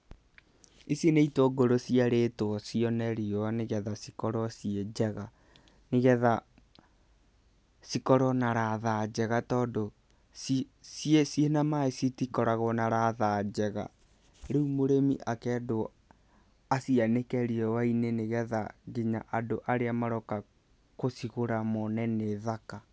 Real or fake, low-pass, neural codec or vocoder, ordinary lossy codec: real; none; none; none